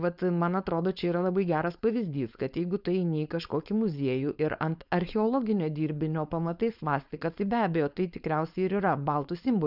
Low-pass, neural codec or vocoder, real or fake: 5.4 kHz; codec, 16 kHz, 4.8 kbps, FACodec; fake